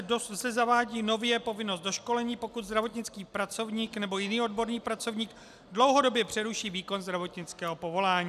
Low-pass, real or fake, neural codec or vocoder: 14.4 kHz; real; none